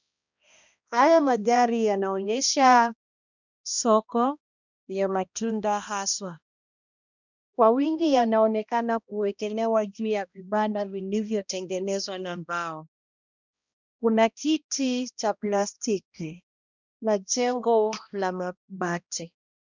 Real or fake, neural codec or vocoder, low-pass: fake; codec, 16 kHz, 1 kbps, X-Codec, HuBERT features, trained on balanced general audio; 7.2 kHz